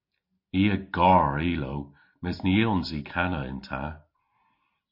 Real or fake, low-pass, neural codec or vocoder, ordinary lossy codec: real; 5.4 kHz; none; MP3, 32 kbps